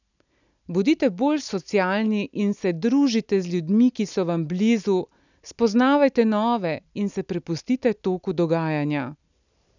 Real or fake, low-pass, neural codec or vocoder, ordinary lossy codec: real; 7.2 kHz; none; none